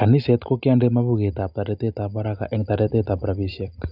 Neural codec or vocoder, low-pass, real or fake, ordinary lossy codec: none; 5.4 kHz; real; none